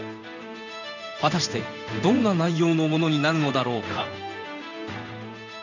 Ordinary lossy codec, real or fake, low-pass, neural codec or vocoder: none; fake; 7.2 kHz; codec, 16 kHz in and 24 kHz out, 1 kbps, XY-Tokenizer